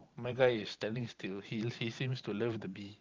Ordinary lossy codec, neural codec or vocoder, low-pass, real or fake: Opus, 24 kbps; vocoder, 44.1 kHz, 128 mel bands every 512 samples, BigVGAN v2; 7.2 kHz; fake